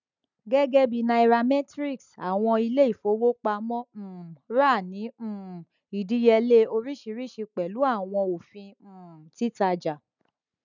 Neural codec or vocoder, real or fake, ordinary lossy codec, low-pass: none; real; none; 7.2 kHz